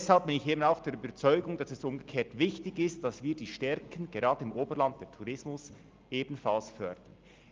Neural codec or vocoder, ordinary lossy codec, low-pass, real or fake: none; Opus, 24 kbps; 7.2 kHz; real